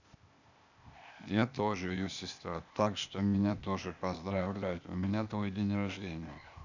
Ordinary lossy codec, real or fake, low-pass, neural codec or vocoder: none; fake; 7.2 kHz; codec, 16 kHz, 0.8 kbps, ZipCodec